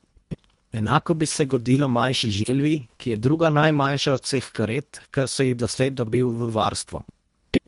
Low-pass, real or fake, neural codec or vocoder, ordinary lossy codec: 10.8 kHz; fake; codec, 24 kHz, 1.5 kbps, HILCodec; MP3, 64 kbps